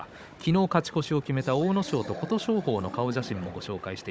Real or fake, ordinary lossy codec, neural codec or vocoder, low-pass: fake; none; codec, 16 kHz, 16 kbps, FunCodec, trained on Chinese and English, 50 frames a second; none